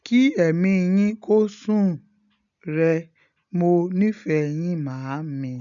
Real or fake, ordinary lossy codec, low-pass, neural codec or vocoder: real; none; 7.2 kHz; none